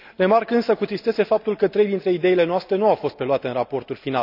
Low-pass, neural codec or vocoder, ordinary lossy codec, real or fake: 5.4 kHz; none; none; real